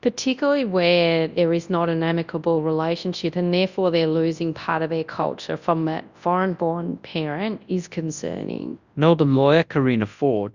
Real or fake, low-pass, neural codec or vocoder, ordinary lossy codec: fake; 7.2 kHz; codec, 24 kHz, 0.9 kbps, WavTokenizer, large speech release; Opus, 64 kbps